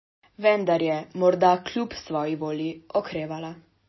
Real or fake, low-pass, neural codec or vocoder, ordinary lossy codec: real; 7.2 kHz; none; MP3, 24 kbps